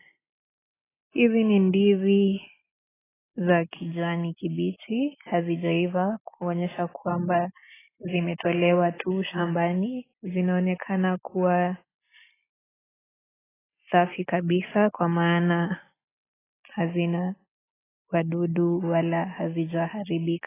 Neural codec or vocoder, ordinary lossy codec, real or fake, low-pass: none; AAC, 16 kbps; real; 3.6 kHz